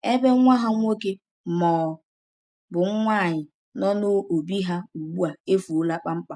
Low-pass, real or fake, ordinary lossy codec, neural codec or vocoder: none; real; none; none